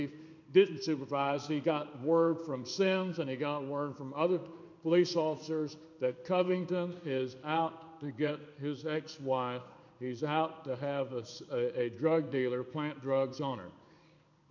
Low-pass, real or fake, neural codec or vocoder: 7.2 kHz; fake; codec, 16 kHz in and 24 kHz out, 1 kbps, XY-Tokenizer